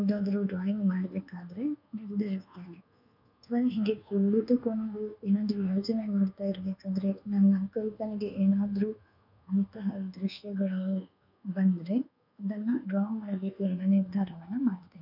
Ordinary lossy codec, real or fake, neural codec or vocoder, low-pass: none; fake; codec, 24 kHz, 1.2 kbps, DualCodec; 5.4 kHz